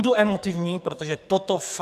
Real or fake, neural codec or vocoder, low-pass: fake; codec, 44.1 kHz, 2.6 kbps, SNAC; 14.4 kHz